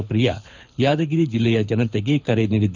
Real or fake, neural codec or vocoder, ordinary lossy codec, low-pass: fake; codec, 24 kHz, 6 kbps, HILCodec; none; 7.2 kHz